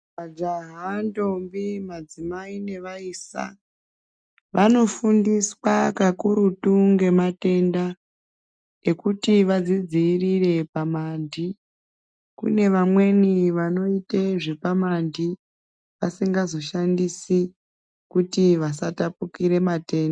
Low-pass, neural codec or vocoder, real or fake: 9.9 kHz; none; real